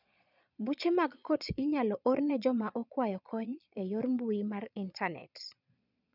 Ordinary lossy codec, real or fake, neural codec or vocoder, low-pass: none; fake; vocoder, 22.05 kHz, 80 mel bands, Vocos; 5.4 kHz